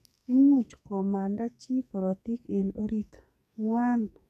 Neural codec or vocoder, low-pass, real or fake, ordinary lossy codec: codec, 44.1 kHz, 2.6 kbps, SNAC; 14.4 kHz; fake; none